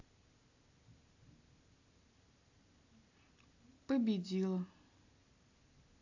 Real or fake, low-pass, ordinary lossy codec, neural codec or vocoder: real; 7.2 kHz; AAC, 48 kbps; none